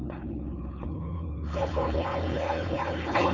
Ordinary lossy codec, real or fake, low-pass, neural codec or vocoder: none; fake; 7.2 kHz; codec, 16 kHz, 4.8 kbps, FACodec